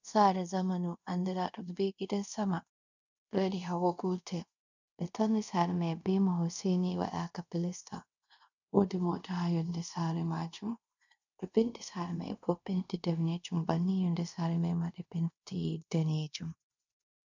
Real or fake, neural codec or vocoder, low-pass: fake; codec, 24 kHz, 0.5 kbps, DualCodec; 7.2 kHz